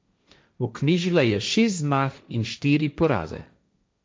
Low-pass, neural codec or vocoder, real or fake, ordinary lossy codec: none; codec, 16 kHz, 1.1 kbps, Voila-Tokenizer; fake; none